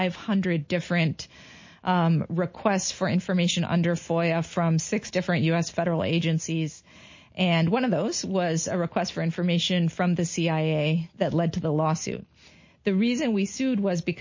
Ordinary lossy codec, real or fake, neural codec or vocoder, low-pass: MP3, 32 kbps; real; none; 7.2 kHz